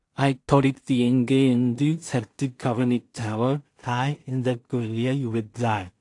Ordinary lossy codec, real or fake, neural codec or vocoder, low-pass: AAC, 48 kbps; fake; codec, 16 kHz in and 24 kHz out, 0.4 kbps, LongCat-Audio-Codec, two codebook decoder; 10.8 kHz